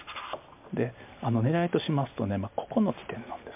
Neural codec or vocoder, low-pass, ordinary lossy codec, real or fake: none; 3.6 kHz; MP3, 32 kbps; real